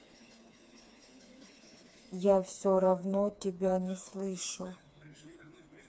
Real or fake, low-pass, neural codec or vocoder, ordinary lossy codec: fake; none; codec, 16 kHz, 4 kbps, FreqCodec, smaller model; none